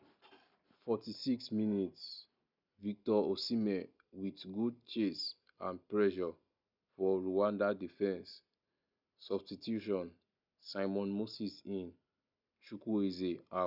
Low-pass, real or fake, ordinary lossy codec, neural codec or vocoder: 5.4 kHz; real; none; none